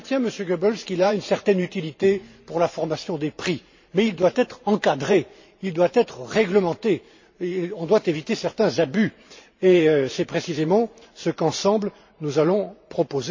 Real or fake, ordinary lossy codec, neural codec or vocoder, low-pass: fake; MP3, 32 kbps; vocoder, 44.1 kHz, 128 mel bands every 512 samples, BigVGAN v2; 7.2 kHz